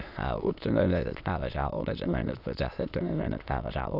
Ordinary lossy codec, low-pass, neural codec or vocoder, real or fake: none; 5.4 kHz; autoencoder, 22.05 kHz, a latent of 192 numbers a frame, VITS, trained on many speakers; fake